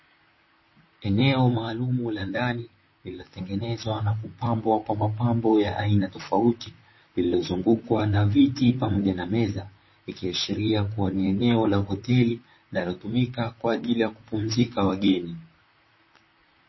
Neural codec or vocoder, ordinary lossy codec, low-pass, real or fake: vocoder, 44.1 kHz, 128 mel bands, Pupu-Vocoder; MP3, 24 kbps; 7.2 kHz; fake